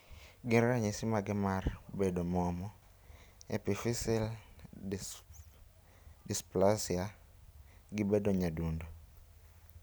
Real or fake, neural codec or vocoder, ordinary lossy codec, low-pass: real; none; none; none